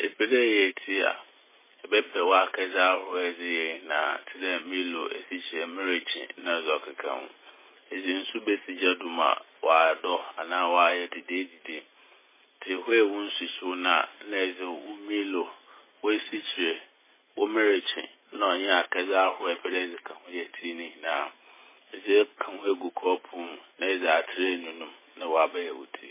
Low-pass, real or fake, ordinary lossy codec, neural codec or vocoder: 3.6 kHz; real; MP3, 16 kbps; none